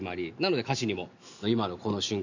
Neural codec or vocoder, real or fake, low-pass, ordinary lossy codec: none; real; 7.2 kHz; none